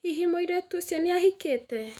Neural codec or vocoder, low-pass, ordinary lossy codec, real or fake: autoencoder, 48 kHz, 128 numbers a frame, DAC-VAE, trained on Japanese speech; 19.8 kHz; none; fake